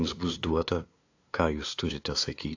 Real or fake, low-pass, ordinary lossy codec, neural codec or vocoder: fake; 7.2 kHz; AAC, 48 kbps; codec, 16 kHz, 2 kbps, FunCodec, trained on LibriTTS, 25 frames a second